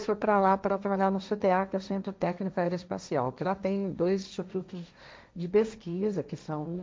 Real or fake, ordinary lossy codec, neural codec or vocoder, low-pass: fake; none; codec, 16 kHz, 1.1 kbps, Voila-Tokenizer; none